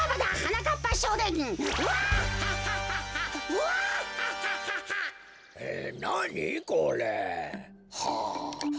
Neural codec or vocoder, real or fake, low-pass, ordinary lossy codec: none; real; none; none